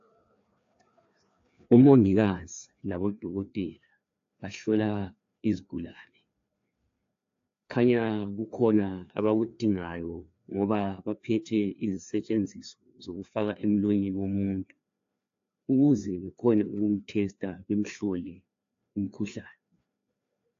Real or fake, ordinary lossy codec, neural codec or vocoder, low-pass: fake; AAC, 48 kbps; codec, 16 kHz, 2 kbps, FreqCodec, larger model; 7.2 kHz